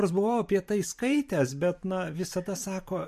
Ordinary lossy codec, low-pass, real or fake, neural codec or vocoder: MP3, 64 kbps; 14.4 kHz; fake; vocoder, 44.1 kHz, 128 mel bands every 512 samples, BigVGAN v2